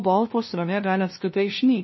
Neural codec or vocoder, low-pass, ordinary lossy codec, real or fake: codec, 16 kHz, 0.5 kbps, FunCodec, trained on LibriTTS, 25 frames a second; 7.2 kHz; MP3, 24 kbps; fake